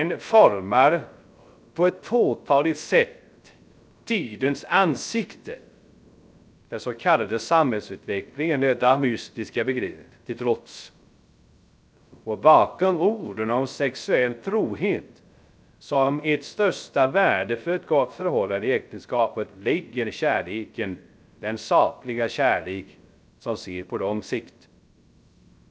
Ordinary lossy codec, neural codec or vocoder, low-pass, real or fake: none; codec, 16 kHz, 0.3 kbps, FocalCodec; none; fake